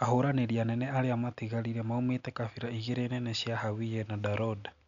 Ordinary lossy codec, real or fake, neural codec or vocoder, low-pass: none; real; none; 7.2 kHz